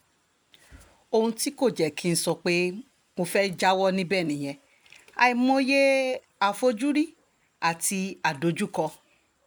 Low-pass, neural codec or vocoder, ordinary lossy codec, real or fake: none; none; none; real